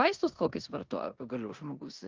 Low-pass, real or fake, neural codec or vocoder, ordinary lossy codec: 7.2 kHz; fake; codec, 16 kHz in and 24 kHz out, 0.9 kbps, LongCat-Audio-Codec, four codebook decoder; Opus, 32 kbps